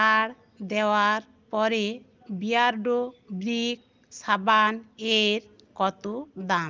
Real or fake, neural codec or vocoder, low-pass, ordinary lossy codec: real; none; 7.2 kHz; Opus, 32 kbps